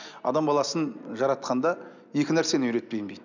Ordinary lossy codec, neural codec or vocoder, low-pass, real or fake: none; none; 7.2 kHz; real